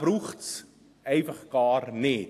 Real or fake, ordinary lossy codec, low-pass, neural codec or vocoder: real; AAC, 64 kbps; 14.4 kHz; none